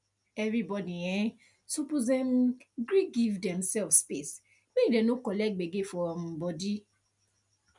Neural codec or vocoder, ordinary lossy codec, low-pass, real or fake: none; none; 10.8 kHz; real